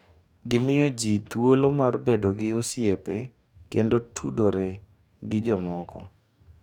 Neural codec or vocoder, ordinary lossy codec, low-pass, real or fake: codec, 44.1 kHz, 2.6 kbps, DAC; none; 19.8 kHz; fake